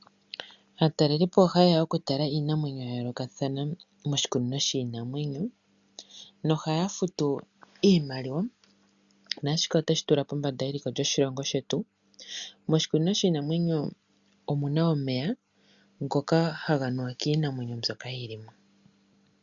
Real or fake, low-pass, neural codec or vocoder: real; 7.2 kHz; none